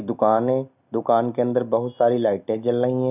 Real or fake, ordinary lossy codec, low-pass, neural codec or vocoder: real; none; 3.6 kHz; none